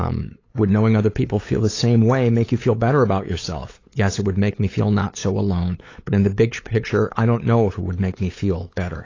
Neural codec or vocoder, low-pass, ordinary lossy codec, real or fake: codec, 16 kHz, 8 kbps, FunCodec, trained on LibriTTS, 25 frames a second; 7.2 kHz; AAC, 32 kbps; fake